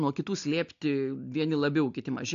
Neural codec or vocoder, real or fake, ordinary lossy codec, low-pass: none; real; AAC, 64 kbps; 7.2 kHz